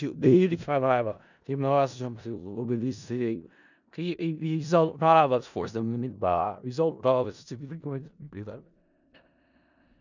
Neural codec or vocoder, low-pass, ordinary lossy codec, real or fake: codec, 16 kHz in and 24 kHz out, 0.4 kbps, LongCat-Audio-Codec, four codebook decoder; 7.2 kHz; none; fake